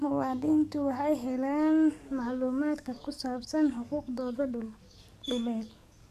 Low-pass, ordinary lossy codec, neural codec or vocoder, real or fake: 14.4 kHz; MP3, 96 kbps; codec, 44.1 kHz, 7.8 kbps, DAC; fake